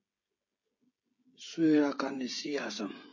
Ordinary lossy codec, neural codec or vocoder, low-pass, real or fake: MP3, 32 kbps; codec, 16 kHz, 16 kbps, FreqCodec, smaller model; 7.2 kHz; fake